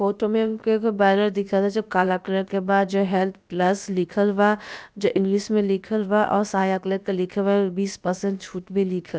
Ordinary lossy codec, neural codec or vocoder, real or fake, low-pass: none; codec, 16 kHz, about 1 kbps, DyCAST, with the encoder's durations; fake; none